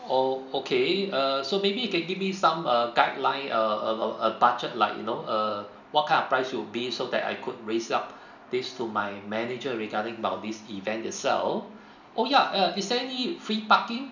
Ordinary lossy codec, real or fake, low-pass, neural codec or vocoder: none; real; 7.2 kHz; none